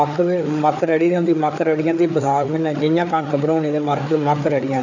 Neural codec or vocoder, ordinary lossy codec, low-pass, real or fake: codec, 16 kHz, 4 kbps, FreqCodec, larger model; none; 7.2 kHz; fake